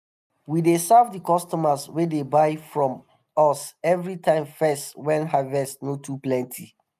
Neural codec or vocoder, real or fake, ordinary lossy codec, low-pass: none; real; none; 14.4 kHz